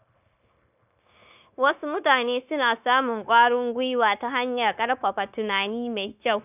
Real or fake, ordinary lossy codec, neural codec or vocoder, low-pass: fake; none; codec, 16 kHz, 0.9 kbps, LongCat-Audio-Codec; 3.6 kHz